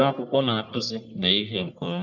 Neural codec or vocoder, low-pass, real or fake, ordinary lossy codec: codec, 44.1 kHz, 1.7 kbps, Pupu-Codec; 7.2 kHz; fake; none